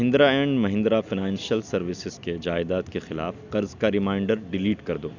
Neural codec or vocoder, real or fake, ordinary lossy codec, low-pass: none; real; none; 7.2 kHz